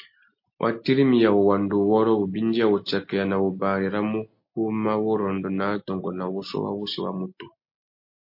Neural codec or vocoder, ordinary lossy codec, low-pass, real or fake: none; MP3, 32 kbps; 5.4 kHz; real